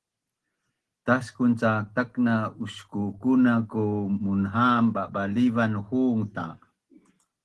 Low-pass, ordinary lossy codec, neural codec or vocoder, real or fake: 10.8 kHz; Opus, 16 kbps; none; real